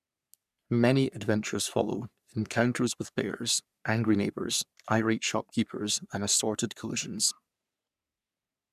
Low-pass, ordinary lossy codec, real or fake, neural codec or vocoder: 14.4 kHz; none; fake; codec, 44.1 kHz, 3.4 kbps, Pupu-Codec